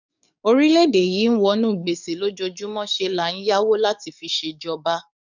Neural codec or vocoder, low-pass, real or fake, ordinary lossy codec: codec, 44.1 kHz, 7.8 kbps, DAC; 7.2 kHz; fake; none